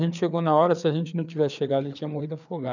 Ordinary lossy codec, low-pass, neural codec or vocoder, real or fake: none; 7.2 kHz; codec, 16 kHz, 4 kbps, FreqCodec, larger model; fake